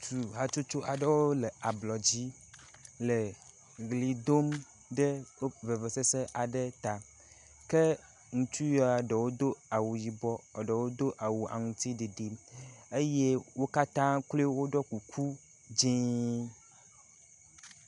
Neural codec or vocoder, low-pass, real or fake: none; 10.8 kHz; real